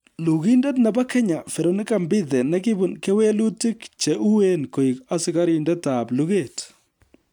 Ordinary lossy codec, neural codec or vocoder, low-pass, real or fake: none; none; 19.8 kHz; real